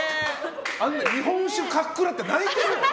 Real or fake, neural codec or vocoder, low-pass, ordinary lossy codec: real; none; none; none